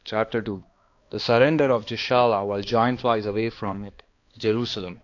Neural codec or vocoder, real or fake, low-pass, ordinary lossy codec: codec, 16 kHz, 2 kbps, X-Codec, HuBERT features, trained on balanced general audio; fake; 7.2 kHz; AAC, 48 kbps